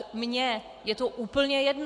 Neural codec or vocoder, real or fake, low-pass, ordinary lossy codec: none; real; 10.8 kHz; AAC, 64 kbps